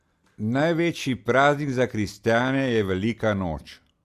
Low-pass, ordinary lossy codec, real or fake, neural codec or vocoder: 14.4 kHz; Opus, 64 kbps; real; none